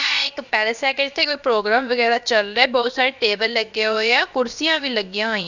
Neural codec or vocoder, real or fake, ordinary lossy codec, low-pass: codec, 16 kHz, about 1 kbps, DyCAST, with the encoder's durations; fake; none; 7.2 kHz